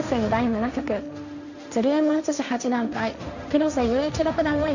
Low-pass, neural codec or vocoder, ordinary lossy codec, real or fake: 7.2 kHz; codec, 16 kHz, 1.1 kbps, Voila-Tokenizer; none; fake